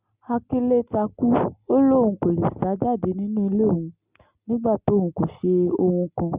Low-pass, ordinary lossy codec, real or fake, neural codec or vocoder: 3.6 kHz; Opus, 64 kbps; real; none